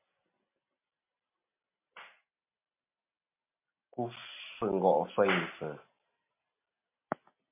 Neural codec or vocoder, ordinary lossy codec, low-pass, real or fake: none; MP3, 32 kbps; 3.6 kHz; real